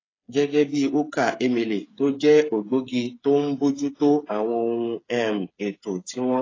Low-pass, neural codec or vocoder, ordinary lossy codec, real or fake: 7.2 kHz; codec, 16 kHz, 8 kbps, FreqCodec, smaller model; AAC, 32 kbps; fake